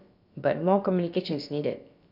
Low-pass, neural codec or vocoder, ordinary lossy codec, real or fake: 5.4 kHz; codec, 16 kHz, about 1 kbps, DyCAST, with the encoder's durations; none; fake